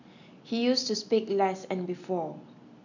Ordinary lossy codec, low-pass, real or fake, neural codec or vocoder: none; 7.2 kHz; real; none